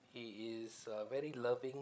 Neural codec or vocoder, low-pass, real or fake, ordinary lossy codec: codec, 16 kHz, 16 kbps, FreqCodec, larger model; none; fake; none